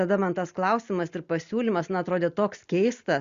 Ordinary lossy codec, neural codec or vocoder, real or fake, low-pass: Opus, 64 kbps; none; real; 7.2 kHz